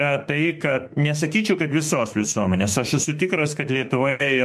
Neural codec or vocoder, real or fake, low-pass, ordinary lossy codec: autoencoder, 48 kHz, 32 numbers a frame, DAC-VAE, trained on Japanese speech; fake; 14.4 kHz; MP3, 64 kbps